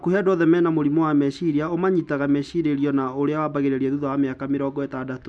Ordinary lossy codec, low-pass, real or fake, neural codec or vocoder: none; none; real; none